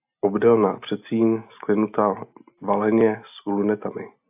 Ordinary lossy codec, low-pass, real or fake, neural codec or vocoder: AAC, 32 kbps; 3.6 kHz; real; none